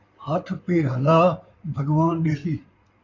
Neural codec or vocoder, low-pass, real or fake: codec, 16 kHz in and 24 kHz out, 2.2 kbps, FireRedTTS-2 codec; 7.2 kHz; fake